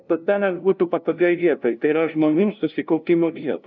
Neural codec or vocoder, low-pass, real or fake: codec, 16 kHz, 0.5 kbps, FunCodec, trained on LibriTTS, 25 frames a second; 7.2 kHz; fake